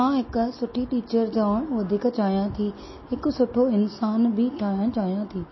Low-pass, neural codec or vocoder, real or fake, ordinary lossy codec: 7.2 kHz; none; real; MP3, 24 kbps